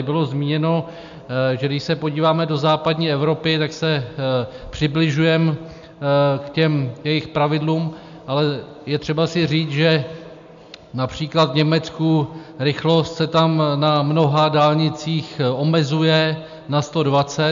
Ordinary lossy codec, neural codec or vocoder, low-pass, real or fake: MP3, 64 kbps; none; 7.2 kHz; real